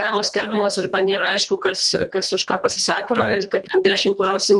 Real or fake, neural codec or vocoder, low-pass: fake; codec, 24 kHz, 1.5 kbps, HILCodec; 10.8 kHz